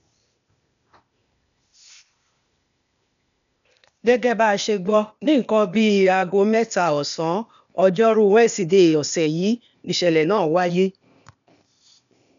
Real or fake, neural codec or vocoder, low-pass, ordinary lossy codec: fake; codec, 16 kHz, 0.8 kbps, ZipCodec; 7.2 kHz; none